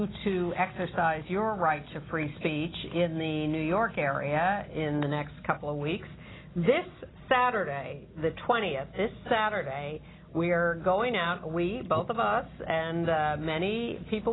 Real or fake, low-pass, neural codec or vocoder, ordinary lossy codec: real; 7.2 kHz; none; AAC, 16 kbps